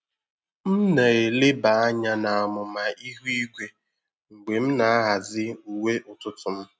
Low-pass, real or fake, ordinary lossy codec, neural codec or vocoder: none; real; none; none